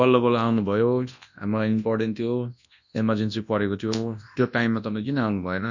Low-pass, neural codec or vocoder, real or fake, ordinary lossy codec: 7.2 kHz; codec, 24 kHz, 0.9 kbps, WavTokenizer, large speech release; fake; none